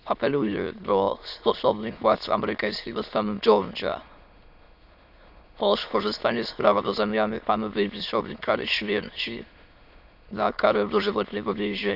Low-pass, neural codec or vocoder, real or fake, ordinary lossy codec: 5.4 kHz; autoencoder, 22.05 kHz, a latent of 192 numbers a frame, VITS, trained on many speakers; fake; none